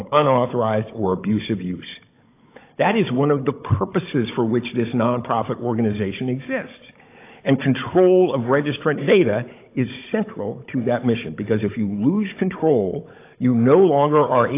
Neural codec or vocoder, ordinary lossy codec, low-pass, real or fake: codec, 16 kHz, 16 kbps, FunCodec, trained on Chinese and English, 50 frames a second; AAC, 24 kbps; 3.6 kHz; fake